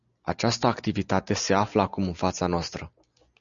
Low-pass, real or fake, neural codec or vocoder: 7.2 kHz; real; none